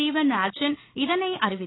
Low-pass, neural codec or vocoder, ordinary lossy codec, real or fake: 7.2 kHz; none; AAC, 16 kbps; real